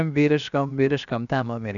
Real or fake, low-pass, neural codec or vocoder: fake; 7.2 kHz; codec, 16 kHz, 0.7 kbps, FocalCodec